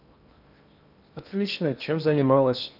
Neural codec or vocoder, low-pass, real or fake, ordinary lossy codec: codec, 16 kHz in and 24 kHz out, 0.8 kbps, FocalCodec, streaming, 65536 codes; 5.4 kHz; fake; none